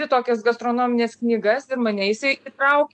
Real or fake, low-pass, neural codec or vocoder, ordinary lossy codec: real; 9.9 kHz; none; MP3, 64 kbps